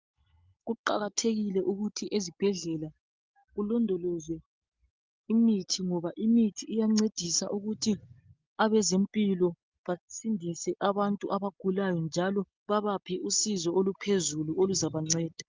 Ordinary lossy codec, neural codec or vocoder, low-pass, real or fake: Opus, 24 kbps; none; 7.2 kHz; real